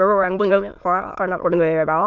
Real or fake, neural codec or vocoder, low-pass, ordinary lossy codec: fake; autoencoder, 22.05 kHz, a latent of 192 numbers a frame, VITS, trained on many speakers; 7.2 kHz; none